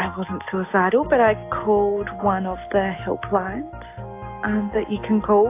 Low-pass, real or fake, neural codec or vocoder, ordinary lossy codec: 3.6 kHz; real; none; AAC, 24 kbps